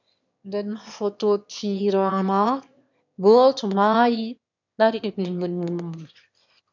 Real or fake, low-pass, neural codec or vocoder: fake; 7.2 kHz; autoencoder, 22.05 kHz, a latent of 192 numbers a frame, VITS, trained on one speaker